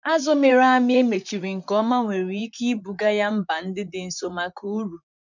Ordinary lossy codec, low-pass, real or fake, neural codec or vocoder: none; 7.2 kHz; fake; vocoder, 44.1 kHz, 128 mel bands, Pupu-Vocoder